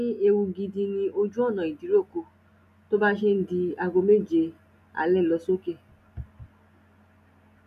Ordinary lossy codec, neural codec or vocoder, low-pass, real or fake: none; none; 14.4 kHz; real